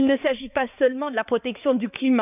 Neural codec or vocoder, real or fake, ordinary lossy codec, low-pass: codec, 16 kHz, 4 kbps, X-Codec, HuBERT features, trained on balanced general audio; fake; none; 3.6 kHz